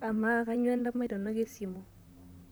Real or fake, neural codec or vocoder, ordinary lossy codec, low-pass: fake; vocoder, 44.1 kHz, 128 mel bands, Pupu-Vocoder; none; none